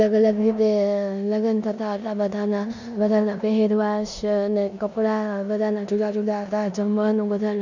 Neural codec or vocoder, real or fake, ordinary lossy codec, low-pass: codec, 16 kHz in and 24 kHz out, 0.9 kbps, LongCat-Audio-Codec, four codebook decoder; fake; none; 7.2 kHz